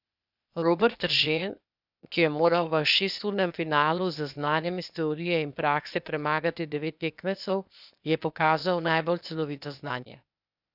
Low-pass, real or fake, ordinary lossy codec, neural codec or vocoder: 5.4 kHz; fake; none; codec, 16 kHz, 0.8 kbps, ZipCodec